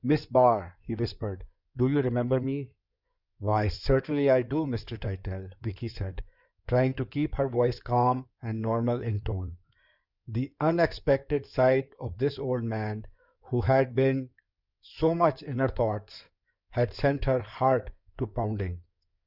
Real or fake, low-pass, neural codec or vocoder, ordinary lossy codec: fake; 5.4 kHz; codec, 16 kHz in and 24 kHz out, 2.2 kbps, FireRedTTS-2 codec; Opus, 64 kbps